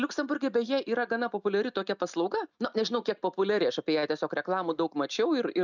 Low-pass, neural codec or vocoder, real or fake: 7.2 kHz; none; real